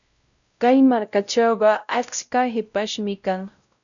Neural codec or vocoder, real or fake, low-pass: codec, 16 kHz, 0.5 kbps, X-Codec, WavLM features, trained on Multilingual LibriSpeech; fake; 7.2 kHz